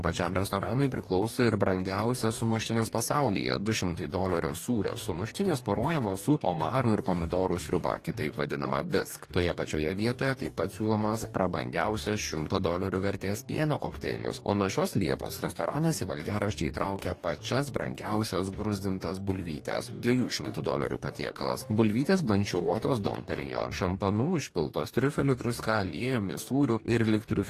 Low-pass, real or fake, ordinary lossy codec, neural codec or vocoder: 14.4 kHz; fake; AAC, 48 kbps; codec, 44.1 kHz, 2.6 kbps, DAC